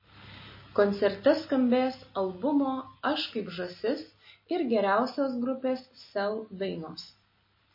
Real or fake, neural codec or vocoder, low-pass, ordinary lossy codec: real; none; 5.4 kHz; MP3, 24 kbps